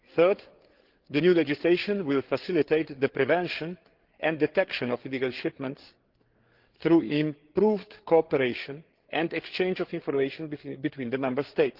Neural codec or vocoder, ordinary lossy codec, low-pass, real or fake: codec, 16 kHz, 8 kbps, FreqCodec, larger model; Opus, 16 kbps; 5.4 kHz; fake